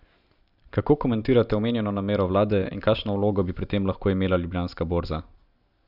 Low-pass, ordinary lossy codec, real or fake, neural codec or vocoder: 5.4 kHz; none; real; none